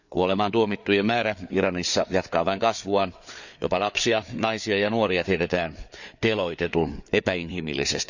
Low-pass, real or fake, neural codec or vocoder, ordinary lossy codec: 7.2 kHz; fake; codec, 16 kHz, 4 kbps, FreqCodec, larger model; none